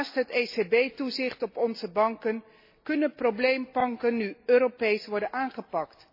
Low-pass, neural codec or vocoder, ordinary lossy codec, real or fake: 5.4 kHz; none; MP3, 24 kbps; real